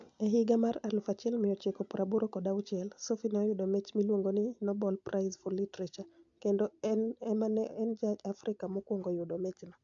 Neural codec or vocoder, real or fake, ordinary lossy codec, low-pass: none; real; AAC, 64 kbps; 7.2 kHz